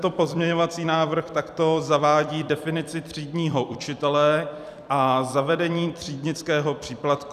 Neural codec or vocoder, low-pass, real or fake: vocoder, 44.1 kHz, 128 mel bands every 256 samples, BigVGAN v2; 14.4 kHz; fake